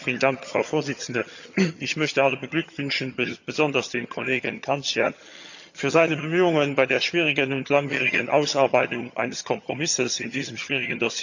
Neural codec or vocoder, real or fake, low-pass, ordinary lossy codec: vocoder, 22.05 kHz, 80 mel bands, HiFi-GAN; fake; 7.2 kHz; none